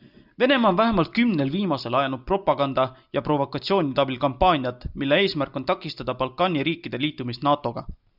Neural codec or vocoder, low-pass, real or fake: none; 5.4 kHz; real